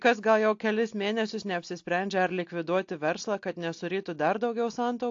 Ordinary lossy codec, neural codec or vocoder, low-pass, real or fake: AAC, 48 kbps; none; 7.2 kHz; real